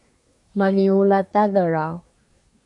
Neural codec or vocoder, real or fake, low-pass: codec, 24 kHz, 1 kbps, SNAC; fake; 10.8 kHz